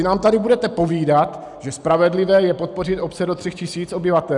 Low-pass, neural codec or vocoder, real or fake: 10.8 kHz; none; real